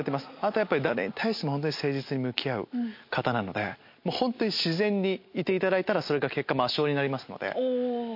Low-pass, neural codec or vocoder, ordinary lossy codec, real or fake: 5.4 kHz; none; MP3, 32 kbps; real